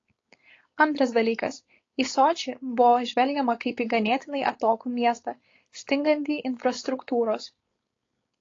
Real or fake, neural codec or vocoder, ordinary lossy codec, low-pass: fake; codec, 16 kHz, 4.8 kbps, FACodec; AAC, 32 kbps; 7.2 kHz